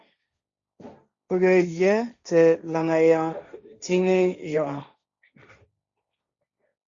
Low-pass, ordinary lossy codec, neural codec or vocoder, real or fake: 7.2 kHz; Opus, 64 kbps; codec, 16 kHz, 1.1 kbps, Voila-Tokenizer; fake